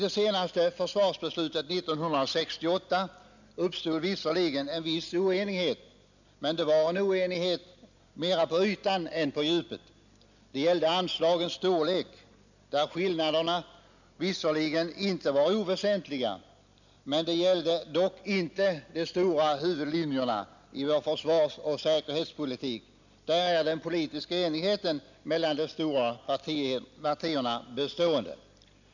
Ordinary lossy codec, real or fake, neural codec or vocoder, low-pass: none; real; none; 7.2 kHz